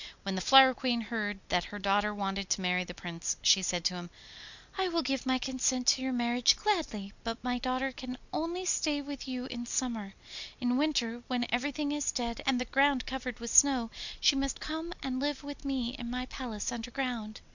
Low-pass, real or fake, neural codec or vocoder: 7.2 kHz; real; none